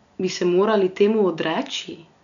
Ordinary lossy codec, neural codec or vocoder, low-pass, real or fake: none; none; 7.2 kHz; real